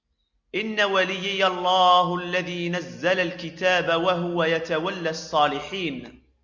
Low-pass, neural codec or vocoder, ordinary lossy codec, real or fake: 7.2 kHz; none; Opus, 64 kbps; real